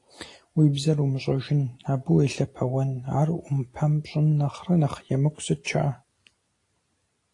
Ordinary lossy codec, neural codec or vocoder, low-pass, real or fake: AAC, 48 kbps; none; 10.8 kHz; real